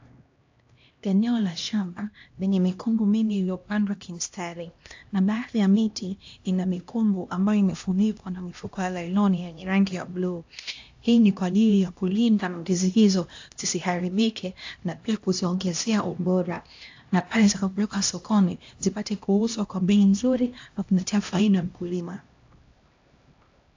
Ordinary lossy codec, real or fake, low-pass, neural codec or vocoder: AAC, 48 kbps; fake; 7.2 kHz; codec, 16 kHz, 1 kbps, X-Codec, HuBERT features, trained on LibriSpeech